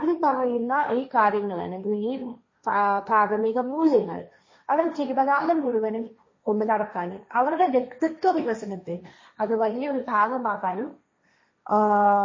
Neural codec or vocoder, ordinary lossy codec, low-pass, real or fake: codec, 16 kHz, 1.1 kbps, Voila-Tokenizer; MP3, 32 kbps; 7.2 kHz; fake